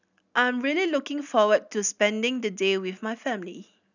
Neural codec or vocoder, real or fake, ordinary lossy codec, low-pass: none; real; none; 7.2 kHz